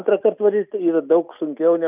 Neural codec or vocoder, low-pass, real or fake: none; 3.6 kHz; real